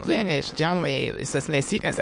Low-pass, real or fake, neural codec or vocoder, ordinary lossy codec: 9.9 kHz; fake; autoencoder, 22.05 kHz, a latent of 192 numbers a frame, VITS, trained on many speakers; MP3, 48 kbps